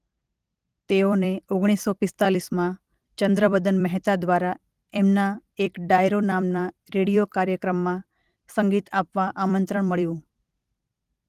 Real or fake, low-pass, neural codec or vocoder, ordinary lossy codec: fake; 14.4 kHz; vocoder, 44.1 kHz, 128 mel bands every 256 samples, BigVGAN v2; Opus, 24 kbps